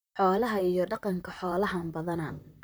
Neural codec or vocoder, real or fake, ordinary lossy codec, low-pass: vocoder, 44.1 kHz, 128 mel bands, Pupu-Vocoder; fake; none; none